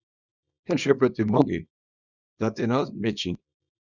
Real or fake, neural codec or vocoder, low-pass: fake; codec, 24 kHz, 0.9 kbps, WavTokenizer, small release; 7.2 kHz